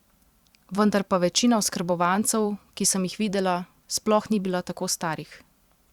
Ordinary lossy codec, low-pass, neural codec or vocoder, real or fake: Opus, 64 kbps; 19.8 kHz; vocoder, 44.1 kHz, 128 mel bands every 512 samples, BigVGAN v2; fake